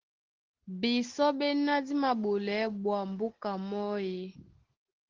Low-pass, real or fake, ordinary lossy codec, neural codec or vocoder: 7.2 kHz; real; Opus, 16 kbps; none